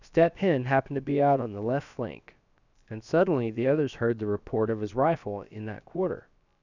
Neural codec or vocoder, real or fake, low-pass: codec, 16 kHz, about 1 kbps, DyCAST, with the encoder's durations; fake; 7.2 kHz